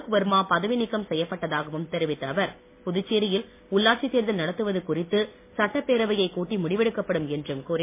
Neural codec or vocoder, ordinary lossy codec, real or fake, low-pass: none; MP3, 24 kbps; real; 3.6 kHz